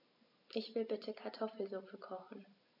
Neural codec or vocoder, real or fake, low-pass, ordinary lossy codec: none; real; 5.4 kHz; none